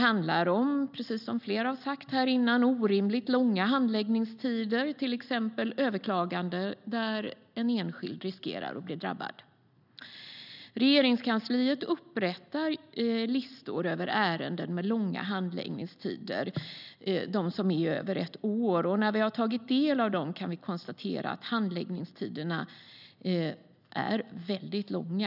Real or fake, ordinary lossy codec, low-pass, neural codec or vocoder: real; none; 5.4 kHz; none